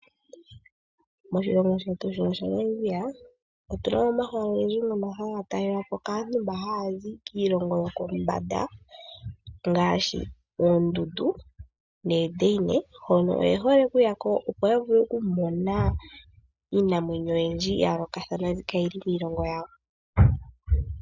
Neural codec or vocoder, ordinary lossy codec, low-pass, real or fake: none; Opus, 64 kbps; 7.2 kHz; real